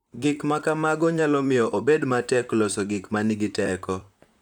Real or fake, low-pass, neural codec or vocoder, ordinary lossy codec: fake; 19.8 kHz; vocoder, 44.1 kHz, 128 mel bands, Pupu-Vocoder; none